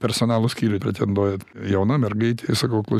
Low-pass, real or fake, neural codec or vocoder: 14.4 kHz; real; none